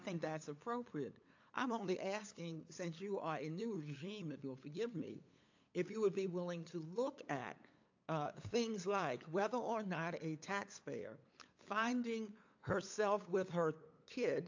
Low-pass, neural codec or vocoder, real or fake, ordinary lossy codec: 7.2 kHz; codec, 16 kHz, 8 kbps, FunCodec, trained on LibriTTS, 25 frames a second; fake; AAC, 48 kbps